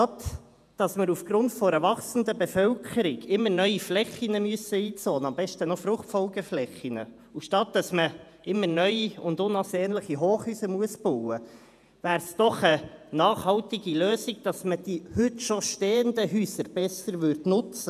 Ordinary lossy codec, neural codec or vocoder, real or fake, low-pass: none; vocoder, 48 kHz, 128 mel bands, Vocos; fake; 14.4 kHz